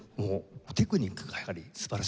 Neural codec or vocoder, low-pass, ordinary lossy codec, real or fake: none; none; none; real